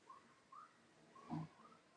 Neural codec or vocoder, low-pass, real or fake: none; 9.9 kHz; real